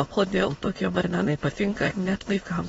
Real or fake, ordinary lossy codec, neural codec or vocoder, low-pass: fake; AAC, 24 kbps; autoencoder, 22.05 kHz, a latent of 192 numbers a frame, VITS, trained on many speakers; 9.9 kHz